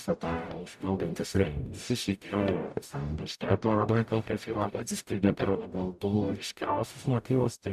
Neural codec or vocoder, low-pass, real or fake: codec, 44.1 kHz, 0.9 kbps, DAC; 14.4 kHz; fake